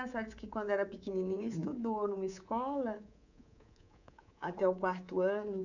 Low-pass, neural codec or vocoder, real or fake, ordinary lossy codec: 7.2 kHz; codec, 24 kHz, 3.1 kbps, DualCodec; fake; none